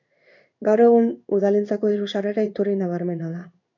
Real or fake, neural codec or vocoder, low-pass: fake; codec, 16 kHz in and 24 kHz out, 1 kbps, XY-Tokenizer; 7.2 kHz